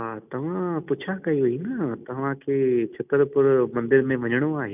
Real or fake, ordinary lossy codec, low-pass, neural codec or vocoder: real; none; 3.6 kHz; none